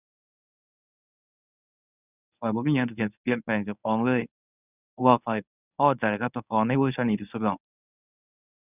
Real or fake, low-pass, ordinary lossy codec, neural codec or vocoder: fake; 3.6 kHz; none; codec, 24 kHz, 0.9 kbps, WavTokenizer, medium speech release version 1